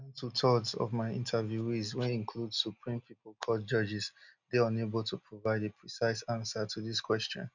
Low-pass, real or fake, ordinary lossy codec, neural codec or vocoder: 7.2 kHz; real; none; none